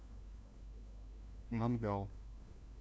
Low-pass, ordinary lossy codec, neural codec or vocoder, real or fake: none; none; codec, 16 kHz, 1 kbps, FunCodec, trained on LibriTTS, 50 frames a second; fake